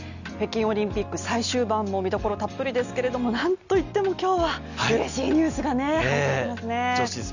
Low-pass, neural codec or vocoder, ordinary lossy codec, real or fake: 7.2 kHz; none; none; real